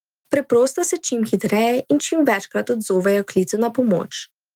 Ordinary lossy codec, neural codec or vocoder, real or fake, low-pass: Opus, 24 kbps; autoencoder, 48 kHz, 128 numbers a frame, DAC-VAE, trained on Japanese speech; fake; 19.8 kHz